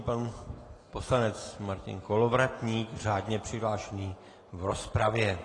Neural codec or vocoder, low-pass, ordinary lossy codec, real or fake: none; 10.8 kHz; AAC, 32 kbps; real